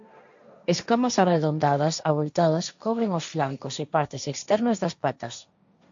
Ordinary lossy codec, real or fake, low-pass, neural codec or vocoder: MP3, 64 kbps; fake; 7.2 kHz; codec, 16 kHz, 1.1 kbps, Voila-Tokenizer